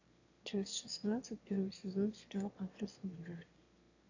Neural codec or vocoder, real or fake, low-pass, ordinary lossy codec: autoencoder, 22.05 kHz, a latent of 192 numbers a frame, VITS, trained on one speaker; fake; 7.2 kHz; AAC, 48 kbps